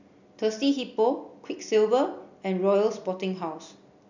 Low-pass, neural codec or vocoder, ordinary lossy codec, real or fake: 7.2 kHz; none; none; real